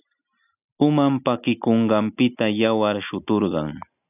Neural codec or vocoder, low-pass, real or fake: none; 3.6 kHz; real